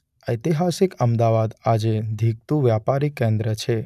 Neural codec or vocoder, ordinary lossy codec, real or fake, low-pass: none; none; real; 14.4 kHz